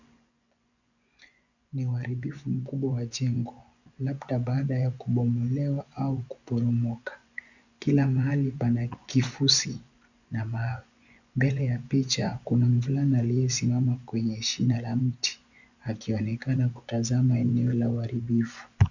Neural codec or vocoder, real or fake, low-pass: vocoder, 24 kHz, 100 mel bands, Vocos; fake; 7.2 kHz